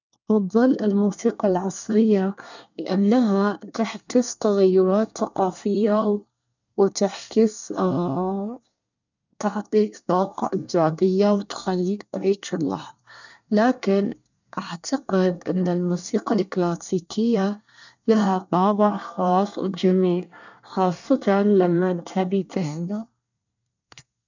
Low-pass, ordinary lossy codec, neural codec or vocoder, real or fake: 7.2 kHz; none; codec, 24 kHz, 1 kbps, SNAC; fake